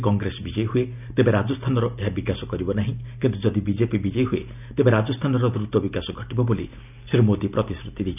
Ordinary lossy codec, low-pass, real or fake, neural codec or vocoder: none; 3.6 kHz; real; none